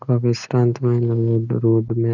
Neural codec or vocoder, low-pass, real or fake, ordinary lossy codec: none; 7.2 kHz; real; none